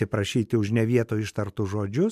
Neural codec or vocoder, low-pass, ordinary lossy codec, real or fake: none; 14.4 kHz; MP3, 96 kbps; real